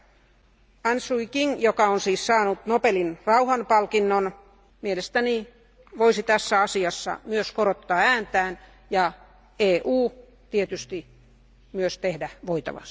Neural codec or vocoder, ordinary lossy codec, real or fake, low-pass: none; none; real; none